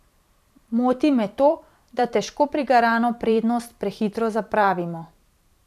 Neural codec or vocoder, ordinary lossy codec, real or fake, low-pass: vocoder, 44.1 kHz, 128 mel bands every 512 samples, BigVGAN v2; none; fake; 14.4 kHz